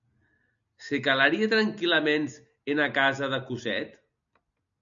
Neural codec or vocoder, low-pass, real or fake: none; 7.2 kHz; real